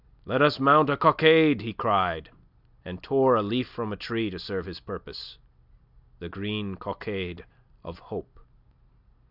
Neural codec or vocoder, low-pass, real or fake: none; 5.4 kHz; real